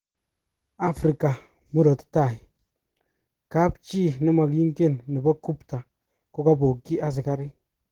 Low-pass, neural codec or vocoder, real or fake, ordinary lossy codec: 19.8 kHz; none; real; Opus, 16 kbps